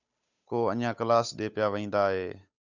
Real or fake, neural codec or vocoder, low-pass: fake; codec, 16 kHz, 8 kbps, FunCodec, trained on Chinese and English, 25 frames a second; 7.2 kHz